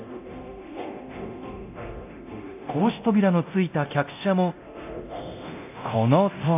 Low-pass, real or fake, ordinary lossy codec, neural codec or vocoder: 3.6 kHz; fake; AAC, 32 kbps; codec, 24 kHz, 0.9 kbps, DualCodec